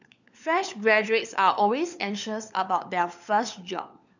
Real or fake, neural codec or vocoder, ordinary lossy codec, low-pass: fake; codec, 16 kHz, 4 kbps, FunCodec, trained on LibriTTS, 50 frames a second; none; 7.2 kHz